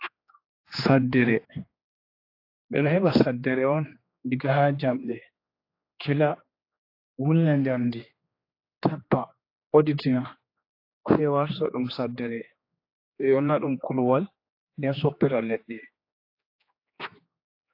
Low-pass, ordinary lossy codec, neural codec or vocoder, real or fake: 5.4 kHz; AAC, 32 kbps; codec, 16 kHz, 2 kbps, X-Codec, HuBERT features, trained on general audio; fake